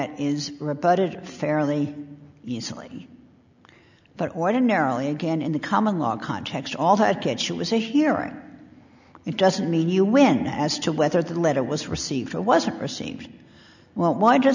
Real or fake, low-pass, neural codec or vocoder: real; 7.2 kHz; none